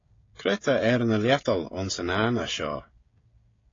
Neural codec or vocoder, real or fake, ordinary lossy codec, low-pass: codec, 16 kHz, 16 kbps, FreqCodec, smaller model; fake; AAC, 32 kbps; 7.2 kHz